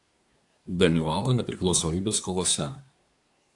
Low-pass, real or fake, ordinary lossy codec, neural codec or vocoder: 10.8 kHz; fake; AAC, 64 kbps; codec, 24 kHz, 1 kbps, SNAC